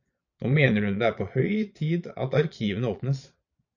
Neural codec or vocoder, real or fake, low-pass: vocoder, 22.05 kHz, 80 mel bands, Vocos; fake; 7.2 kHz